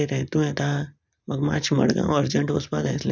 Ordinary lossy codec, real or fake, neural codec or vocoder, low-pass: none; real; none; none